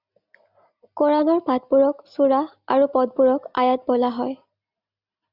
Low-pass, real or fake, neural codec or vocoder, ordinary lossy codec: 5.4 kHz; real; none; Opus, 64 kbps